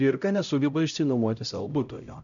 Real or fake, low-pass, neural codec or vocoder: fake; 7.2 kHz; codec, 16 kHz, 0.5 kbps, X-Codec, HuBERT features, trained on LibriSpeech